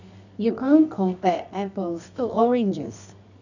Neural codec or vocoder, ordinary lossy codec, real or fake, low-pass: codec, 24 kHz, 0.9 kbps, WavTokenizer, medium music audio release; none; fake; 7.2 kHz